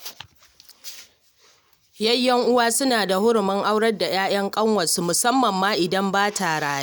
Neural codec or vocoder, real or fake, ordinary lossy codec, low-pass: none; real; none; none